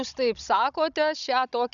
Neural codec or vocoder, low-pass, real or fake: codec, 16 kHz, 16 kbps, FunCodec, trained on Chinese and English, 50 frames a second; 7.2 kHz; fake